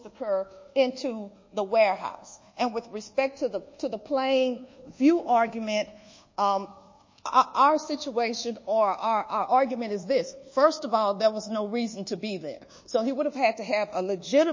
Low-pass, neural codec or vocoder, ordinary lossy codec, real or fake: 7.2 kHz; codec, 24 kHz, 1.2 kbps, DualCodec; MP3, 32 kbps; fake